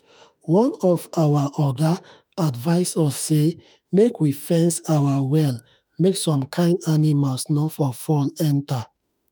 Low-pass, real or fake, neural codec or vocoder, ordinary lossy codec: none; fake; autoencoder, 48 kHz, 32 numbers a frame, DAC-VAE, trained on Japanese speech; none